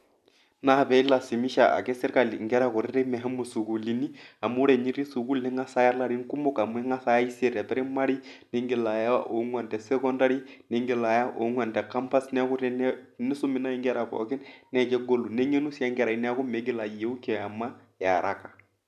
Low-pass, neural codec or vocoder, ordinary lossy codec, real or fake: 14.4 kHz; none; none; real